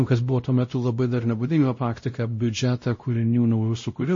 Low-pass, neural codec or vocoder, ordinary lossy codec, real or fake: 7.2 kHz; codec, 16 kHz, 0.5 kbps, X-Codec, WavLM features, trained on Multilingual LibriSpeech; MP3, 32 kbps; fake